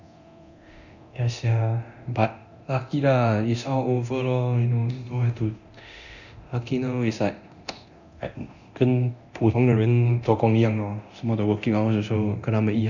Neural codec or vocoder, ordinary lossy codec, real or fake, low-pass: codec, 24 kHz, 0.9 kbps, DualCodec; none; fake; 7.2 kHz